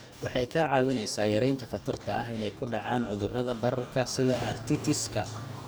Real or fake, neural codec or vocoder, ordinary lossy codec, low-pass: fake; codec, 44.1 kHz, 2.6 kbps, DAC; none; none